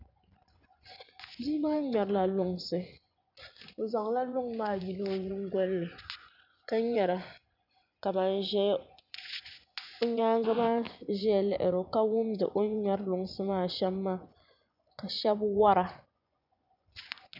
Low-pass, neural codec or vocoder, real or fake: 5.4 kHz; vocoder, 24 kHz, 100 mel bands, Vocos; fake